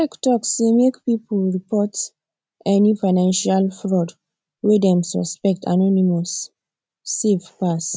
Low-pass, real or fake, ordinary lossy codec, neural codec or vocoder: none; real; none; none